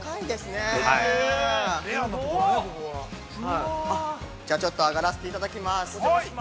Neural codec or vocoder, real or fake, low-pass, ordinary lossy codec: none; real; none; none